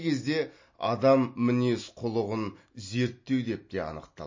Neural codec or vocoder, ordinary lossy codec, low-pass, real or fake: none; MP3, 32 kbps; 7.2 kHz; real